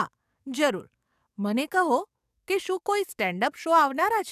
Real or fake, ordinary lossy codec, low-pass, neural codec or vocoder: fake; none; 14.4 kHz; codec, 44.1 kHz, 7.8 kbps, DAC